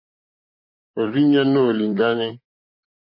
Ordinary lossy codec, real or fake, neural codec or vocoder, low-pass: MP3, 24 kbps; fake; vocoder, 44.1 kHz, 128 mel bands, Pupu-Vocoder; 5.4 kHz